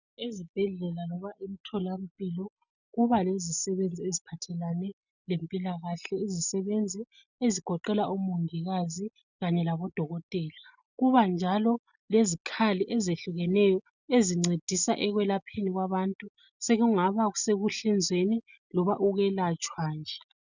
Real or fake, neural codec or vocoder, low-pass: real; none; 7.2 kHz